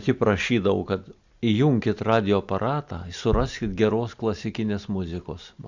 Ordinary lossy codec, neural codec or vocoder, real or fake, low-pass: Opus, 64 kbps; none; real; 7.2 kHz